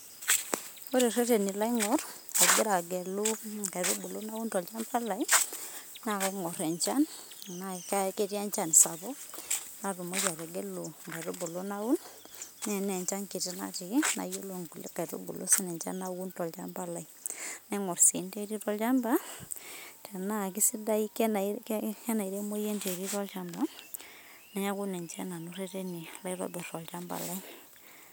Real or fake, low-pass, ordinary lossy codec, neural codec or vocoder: real; none; none; none